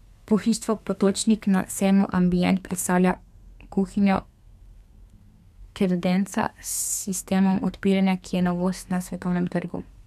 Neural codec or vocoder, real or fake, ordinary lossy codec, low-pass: codec, 32 kHz, 1.9 kbps, SNAC; fake; none; 14.4 kHz